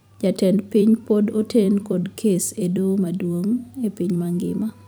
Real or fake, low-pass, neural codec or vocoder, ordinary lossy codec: fake; none; vocoder, 44.1 kHz, 128 mel bands every 256 samples, BigVGAN v2; none